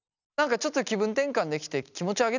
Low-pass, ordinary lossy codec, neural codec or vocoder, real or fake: 7.2 kHz; none; none; real